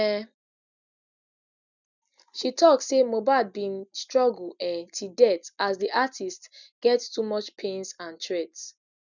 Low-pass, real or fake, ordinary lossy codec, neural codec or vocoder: 7.2 kHz; real; none; none